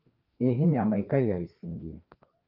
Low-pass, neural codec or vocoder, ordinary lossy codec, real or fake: 5.4 kHz; codec, 16 kHz, 2 kbps, FreqCodec, larger model; Opus, 32 kbps; fake